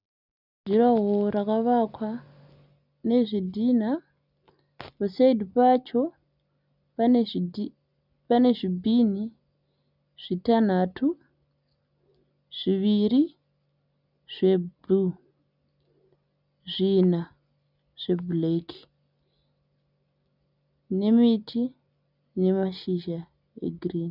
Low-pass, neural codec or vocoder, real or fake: 5.4 kHz; none; real